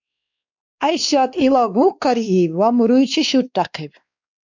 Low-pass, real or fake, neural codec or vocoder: 7.2 kHz; fake; codec, 16 kHz, 2 kbps, X-Codec, WavLM features, trained on Multilingual LibriSpeech